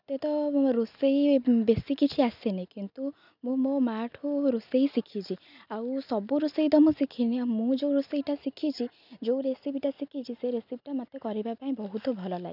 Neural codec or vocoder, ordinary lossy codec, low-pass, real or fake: none; none; 5.4 kHz; real